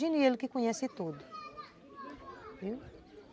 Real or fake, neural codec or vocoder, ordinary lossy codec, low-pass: real; none; none; none